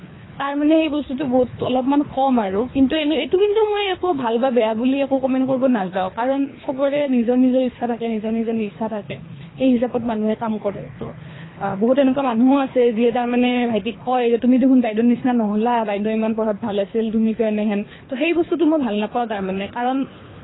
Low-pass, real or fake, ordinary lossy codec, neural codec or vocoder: 7.2 kHz; fake; AAC, 16 kbps; codec, 24 kHz, 3 kbps, HILCodec